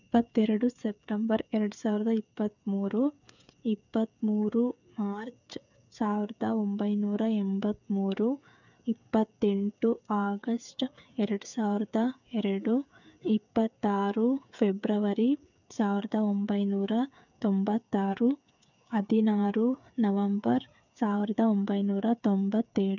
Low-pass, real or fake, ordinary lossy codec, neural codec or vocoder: 7.2 kHz; fake; none; codec, 16 kHz, 8 kbps, FreqCodec, smaller model